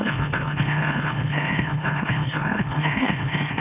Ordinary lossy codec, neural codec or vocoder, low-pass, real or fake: none; autoencoder, 44.1 kHz, a latent of 192 numbers a frame, MeloTTS; 3.6 kHz; fake